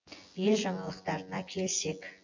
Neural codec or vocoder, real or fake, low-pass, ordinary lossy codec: vocoder, 24 kHz, 100 mel bands, Vocos; fake; 7.2 kHz; MP3, 48 kbps